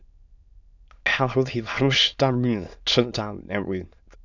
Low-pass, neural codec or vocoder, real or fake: 7.2 kHz; autoencoder, 22.05 kHz, a latent of 192 numbers a frame, VITS, trained on many speakers; fake